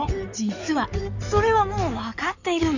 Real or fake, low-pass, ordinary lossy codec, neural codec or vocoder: fake; 7.2 kHz; none; codec, 16 kHz in and 24 kHz out, 2.2 kbps, FireRedTTS-2 codec